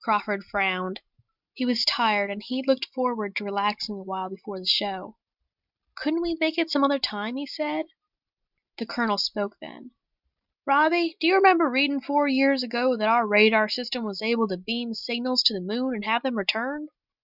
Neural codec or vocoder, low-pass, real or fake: none; 5.4 kHz; real